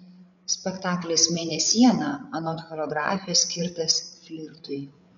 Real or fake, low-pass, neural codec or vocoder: fake; 7.2 kHz; codec, 16 kHz, 8 kbps, FreqCodec, larger model